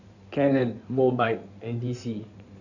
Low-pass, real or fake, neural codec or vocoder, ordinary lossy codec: 7.2 kHz; fake; codec, 16 kHz in and 24 kHz out, 2.2 kbps, FireRedTTS-2 codec; none